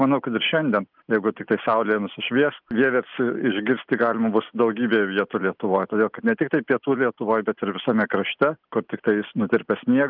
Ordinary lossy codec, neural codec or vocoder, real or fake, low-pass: Opus, 24 kbps; none; real; 5.4 kHz